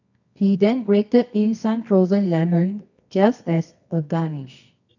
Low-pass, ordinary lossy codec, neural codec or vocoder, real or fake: 7.2 kHz; none; codec, 24 kHz, 0.9 kbps, WavTokenizer, medium music audio release; fake